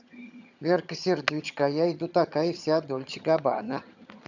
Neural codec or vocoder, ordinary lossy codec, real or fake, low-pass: vocoder, 22.05 kHz, 80 mel bands, HiFi-GAN; none; fake; 7.2 kHz